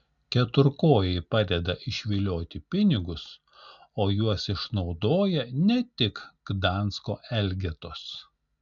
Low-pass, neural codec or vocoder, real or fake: 7.2 kHz; none; real